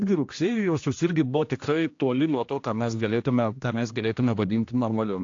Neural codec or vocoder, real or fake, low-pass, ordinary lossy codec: codec, 16 kHz, 1 kbps, X-Codec, HuBERT features, trained on general audio; fake; 7.2 kHz; AAC, 48 kbps